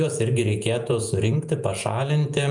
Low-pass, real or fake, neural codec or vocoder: 10.8 kHz; fake; vocoder, 48 kHz, 128 mel bands, Vocos